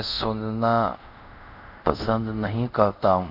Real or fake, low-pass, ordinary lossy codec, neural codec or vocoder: fake; 5.4 kHz; none; codec, 24 kHz, 0.5 kbps, DualCodec